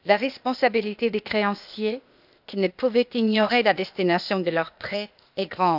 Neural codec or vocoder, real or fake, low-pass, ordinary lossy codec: codec, 16 kHz, 0.8 kbps, ZipCodec; fake; 5.4 kHz; none